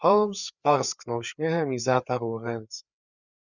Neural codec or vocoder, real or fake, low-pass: codec, 16 kHz, 4 kbps, FreqCodec, larger model; fake; 7.2 kHz